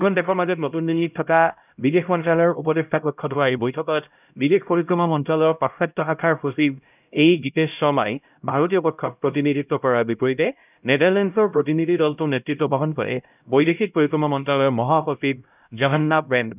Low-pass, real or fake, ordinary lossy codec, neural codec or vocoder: 3.6 kHz; fake; none; codec, 16 kHz, 0.5 kbps, X-Codec, HuBERT features, trained on LibriSpeech